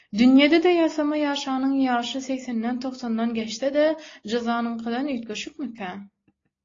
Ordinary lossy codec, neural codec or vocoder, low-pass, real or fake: AAC, 32 kbps; none; 7.2 kHz; real